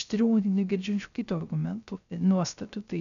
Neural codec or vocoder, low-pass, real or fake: codec, 16 kHz, 0.3 kbps, FocalCodec; 7.2 kHz; fake